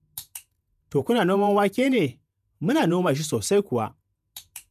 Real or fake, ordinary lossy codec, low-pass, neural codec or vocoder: fake; none; 14.4 kHz; vocoder, 48 kHz, 128 mel bands, Vocos